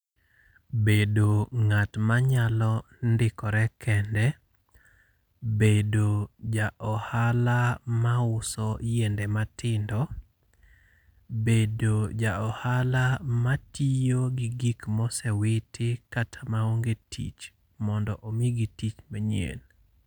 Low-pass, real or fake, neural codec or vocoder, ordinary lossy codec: none; real; none; none